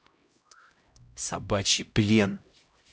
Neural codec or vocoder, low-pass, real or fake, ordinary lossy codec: codec, 16 kHz, 0.5 kbps, X-Codec, HuBERT features, trained on LibriSpeech; none; fake; none